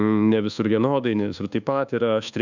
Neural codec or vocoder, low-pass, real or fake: codec, 24 kHz, 1.2 kbps, DualCodec; 7.2 kHz; fake